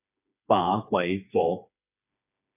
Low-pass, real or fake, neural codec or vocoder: 3.6 kHz; fake; codec, 16 kHz, 4 kbps, FreqCodec, smaller model